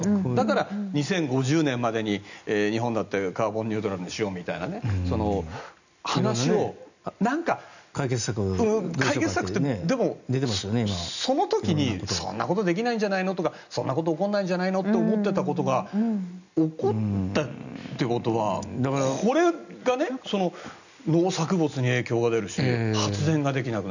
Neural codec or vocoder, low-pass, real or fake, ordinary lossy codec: none; 7.2 kHz; real; none